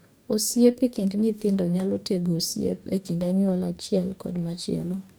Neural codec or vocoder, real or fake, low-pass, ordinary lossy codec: codec, 44.1 kHz, 2.6 kbps, DAC; fake; none; none